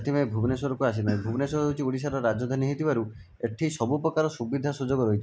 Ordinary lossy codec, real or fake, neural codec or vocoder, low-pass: none; real; none; none